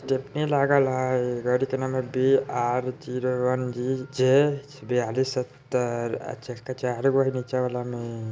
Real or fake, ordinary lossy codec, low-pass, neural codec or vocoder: real; none; none; none